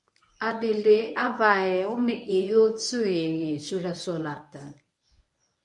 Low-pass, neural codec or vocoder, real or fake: 10.8 kHz; codec, 24 kHz, 0.9 kbps, WavTokenizer, medium speech release version 1; fake